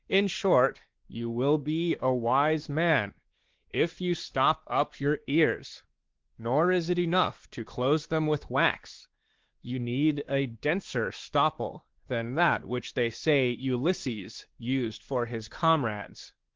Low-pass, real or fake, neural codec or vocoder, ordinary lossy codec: 7.2 kHz; fake; codec, 16 kHz, 2 kbps, X-Codec, WavLM features, trained on Multilingual LibriSpeech; Opus, 16 kbps